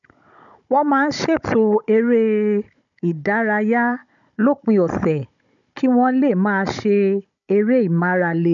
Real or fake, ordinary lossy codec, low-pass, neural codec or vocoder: fake; none; 7.2 kHz; codec, 16 kHz, 16 kbps, FunCodec, trained on Chinese and English, 50 frames a second